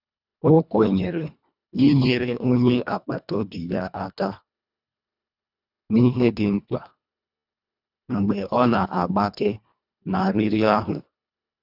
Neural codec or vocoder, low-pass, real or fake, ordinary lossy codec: codec, 24 kHz, 1.5 kbps, HILCodec; 5.4 kHz; fake; none